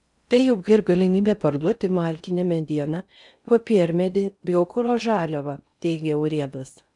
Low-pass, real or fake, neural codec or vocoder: 10.8 kHz; fake; codec, 16 kHz in and 24 kHz out, 0.6 kbps, FocalCodec, streaming, 4096 codes